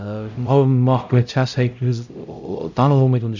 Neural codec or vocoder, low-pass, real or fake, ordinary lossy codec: codec, 16 kHz, 0.5 kbps, X-Codec, HuBERT features, trained on LibriSpeech; 7.2 kHz; fake; none